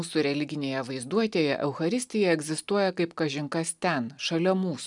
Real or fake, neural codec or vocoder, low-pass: real; none; 10.8 kHz